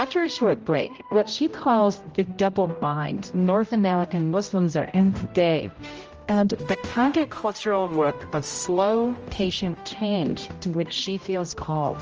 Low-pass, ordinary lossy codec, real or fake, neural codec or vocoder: 7.2 kHz; Opus, 24 kbps; fake; codec, 16 kHz, 0.5 kbps, X-Codec, HuBERT features, trained on general audio